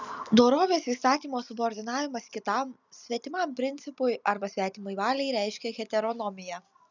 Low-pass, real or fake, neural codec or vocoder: 7.2 kHz; real; none